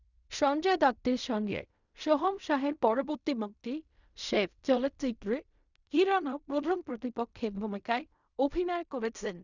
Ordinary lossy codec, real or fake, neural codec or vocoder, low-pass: none; fake; codec, 16 kHz in and 24 kHz out, 0.4 kbps, LongCat-Audio-Codec, fine tuned four codebook decoder; 7.2 kHz